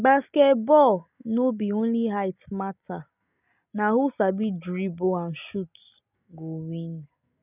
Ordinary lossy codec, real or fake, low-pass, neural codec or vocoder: none; real; 3.6 kHz; none